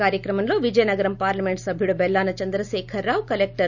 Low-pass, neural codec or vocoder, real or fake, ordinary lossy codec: 7.2 kHz; none; real; none